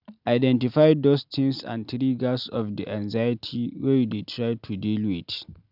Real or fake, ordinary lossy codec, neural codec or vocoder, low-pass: real; none; none; 5.4 kHz